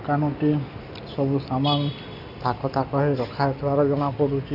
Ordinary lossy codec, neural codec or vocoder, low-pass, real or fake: none; none; 5.4 kHz; real